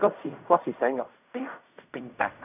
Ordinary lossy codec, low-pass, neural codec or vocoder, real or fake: none; 3.6 kHz; codec, 16 kHz in and 24 kHz out, 0.4 kbps, LongCat-Audio-Codec, fine tuned four codebook decoder; fake